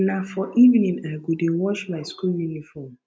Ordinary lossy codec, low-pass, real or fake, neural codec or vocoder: none; none; real; none